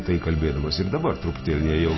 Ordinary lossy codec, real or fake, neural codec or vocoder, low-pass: MP3, 24 kbps; real; none; 7.2 kHz